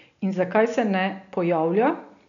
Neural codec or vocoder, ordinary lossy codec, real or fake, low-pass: none; none; real; 7.2 kHz